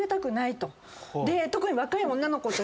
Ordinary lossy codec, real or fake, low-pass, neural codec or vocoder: none; real; none; none